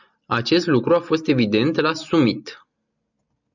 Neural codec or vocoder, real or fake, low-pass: none; real; 7.2 kHz